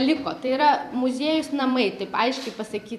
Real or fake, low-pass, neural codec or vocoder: fake; 14.4 kHz; vocoder, 44.1 kHz, 128 mel bands every 256 samples, BigVGAN v2